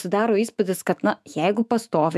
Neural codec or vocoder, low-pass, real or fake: autoencoder, 48 kHz, 128 numbers a frame, DAC-VAE, trained on Japanese speech; 14.4 kHz; fake